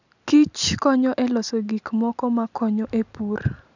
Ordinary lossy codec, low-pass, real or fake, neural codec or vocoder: none; 7.2 kHz; real; none